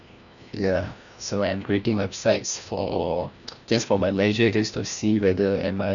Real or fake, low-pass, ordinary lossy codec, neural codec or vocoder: fake; 7.2 kHz; none; codec, 16 kHz, 1 kbps, FreqCodec, larger model